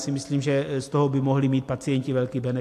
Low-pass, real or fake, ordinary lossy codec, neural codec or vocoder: 14.4 kHz; real; AAC, 64 kbps; none